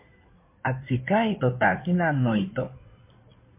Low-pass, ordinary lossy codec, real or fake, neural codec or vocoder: 3.6 kHz; MP3, 24 kbps; fake; codec, 16 kHz in and 24 kHz out, 2.2 kbps, FireRedTTS-2 codec